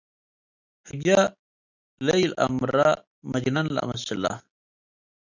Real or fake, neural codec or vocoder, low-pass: real; none; 7.2 kHz